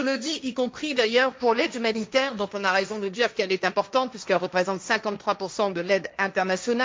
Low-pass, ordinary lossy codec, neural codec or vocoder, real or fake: none; none; codec, 16 kHz, 1.1 kbps, Voila-Tokenizer; fake